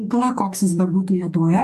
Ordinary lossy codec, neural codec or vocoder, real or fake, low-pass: MP3, 96 kbps; codec, 44.1 kHz, 2.6 kbps, DAC; fake; 14.4 kHz